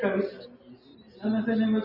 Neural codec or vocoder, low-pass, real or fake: none; 5.4 kHz; real